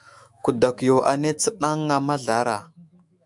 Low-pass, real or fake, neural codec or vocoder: 10.8 kHz; fake; autoencoder, 48 kHz, 128 numbers a frame, DAC-VAE, trained on Japanese speech